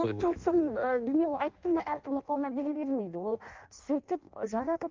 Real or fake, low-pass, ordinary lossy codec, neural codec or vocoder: fake; 7.2 kHz; Opus, 32 kbps; codec, 16 kHz in and 24 kHz out, 0.6 kbps, FireRedTTS-2 codec